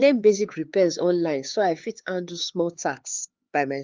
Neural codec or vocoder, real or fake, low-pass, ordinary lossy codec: codec, 16 kHz, 4 kbps, X-Codec, HuBERT features, trained on LibriSpeech; fake; 7.2 kHz; Opus, 32 kbps